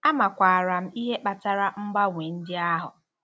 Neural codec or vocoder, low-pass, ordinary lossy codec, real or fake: none; none; none; real